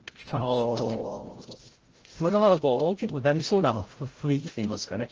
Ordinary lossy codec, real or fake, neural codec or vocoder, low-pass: Opus, 16 kbps; fake; codec, 16 kHz, 0.5 kbps, FreqCodec, larger model; 7.2 kHz